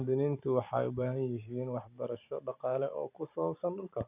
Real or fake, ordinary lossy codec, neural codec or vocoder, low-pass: fake; none; vocoder, 44.1 kHz, 128 mel bands every 512 samples, BigVGAN v2; 3.6 kHz